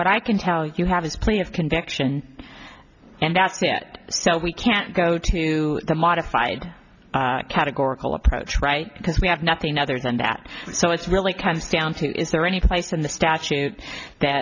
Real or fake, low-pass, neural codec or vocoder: real; 7.2 kHz; none